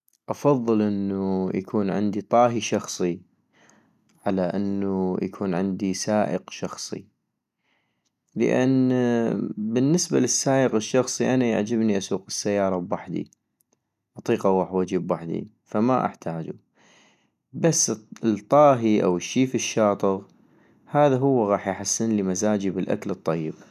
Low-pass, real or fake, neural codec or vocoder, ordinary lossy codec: 14.4 kHz; real; none; none